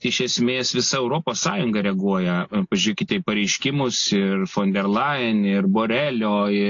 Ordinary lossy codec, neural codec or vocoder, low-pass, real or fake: AAC, 48 kbps; none; 7.2 kHz; real